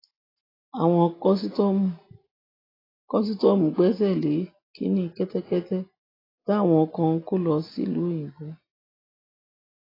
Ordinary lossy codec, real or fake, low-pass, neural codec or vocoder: AAC, 24 kbps; real; 5.4 kHz; none